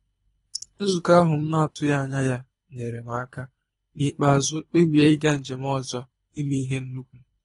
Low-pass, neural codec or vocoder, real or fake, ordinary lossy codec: 10.8 kHz; codec, 24 kHz, 3 kbps, HILCodec; fake; AAC, 32 kbps